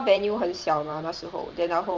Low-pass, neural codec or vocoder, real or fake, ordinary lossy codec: 7.2 kHz; vocoder, 44.1 kHz, 128 mel bands every 512 samples, BigVGAN v2; fake; Opus, 32 kbps